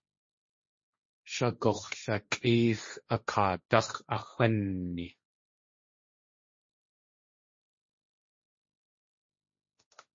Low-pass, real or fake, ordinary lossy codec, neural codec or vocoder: 7.2 kHz; fake; MP3, 32 kbps; codec, 16 kHz, 1.1 kbps, Voila-Tokenizer